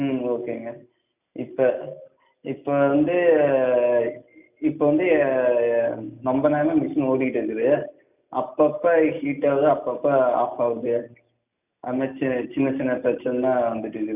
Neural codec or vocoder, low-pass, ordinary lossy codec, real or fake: none; 3.6 kHz; none; real